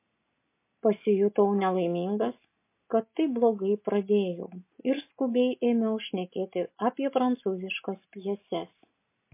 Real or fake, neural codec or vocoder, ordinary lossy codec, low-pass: real; none; MP3, 24 kbps; 3.6 kHz